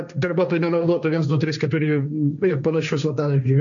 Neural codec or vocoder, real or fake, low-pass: codec, 16 kHz, 1.1 kbps, Voila-Tokenizer; fake; 7.2 kHz